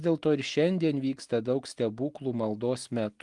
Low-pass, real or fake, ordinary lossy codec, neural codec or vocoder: 10.8 kHz; real; Opus, 24 kbps; none